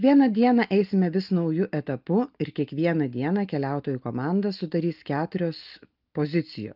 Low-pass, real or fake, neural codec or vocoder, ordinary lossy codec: 5.4 kHz; real; none; Opus, 24 kbps